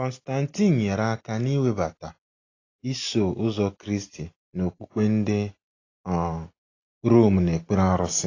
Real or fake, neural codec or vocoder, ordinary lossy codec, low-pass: real; none; AAC, 32 kbps; 7.2 kHz